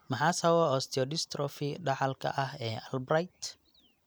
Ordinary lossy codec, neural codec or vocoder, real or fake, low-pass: none; none; real; none